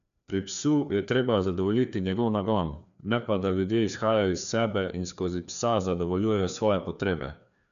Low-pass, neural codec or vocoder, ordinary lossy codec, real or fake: 7.2 kHz; codec, 16 kHz, 2 kbps, FreqCodec, larger model; none; fake